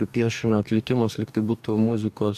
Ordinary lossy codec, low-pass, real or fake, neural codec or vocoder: AAC, 96 kbps; 14.4 kHz; fake; codec, 44.1 kHz, 2.6 kbps, DAC